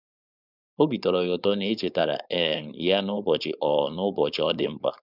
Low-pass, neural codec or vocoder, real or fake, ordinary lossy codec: 5.4 kHz; codec, 16 kHz, 4.8 kbps, FACodec; fake; none